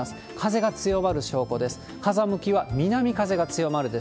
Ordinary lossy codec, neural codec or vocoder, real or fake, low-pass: none; none; real; none